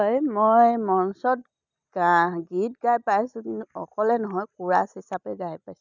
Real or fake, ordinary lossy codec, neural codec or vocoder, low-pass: real; none; none; 7.2 kHz